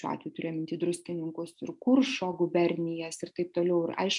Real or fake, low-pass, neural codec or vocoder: real; 10.8 kHz; none